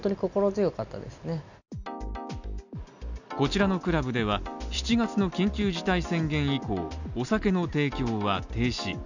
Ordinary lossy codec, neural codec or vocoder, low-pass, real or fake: none; none; 7.2 kHz; real